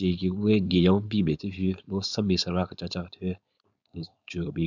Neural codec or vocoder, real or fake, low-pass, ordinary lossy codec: codec, 16 kHz, 4.8 kbps, FACodec; fake; 7.2 kHz; none